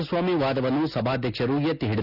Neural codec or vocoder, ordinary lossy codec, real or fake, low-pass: none; none; real; 5.4 kHz